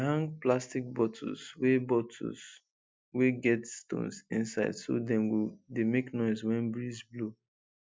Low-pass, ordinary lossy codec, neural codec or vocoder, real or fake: none; none; none; real